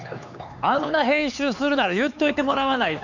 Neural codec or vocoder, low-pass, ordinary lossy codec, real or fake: codec, 16 kHz, 4 kbps, X-Codec, HuBERT features, trained on LibriSpeech; 7.2 kHz; none; fake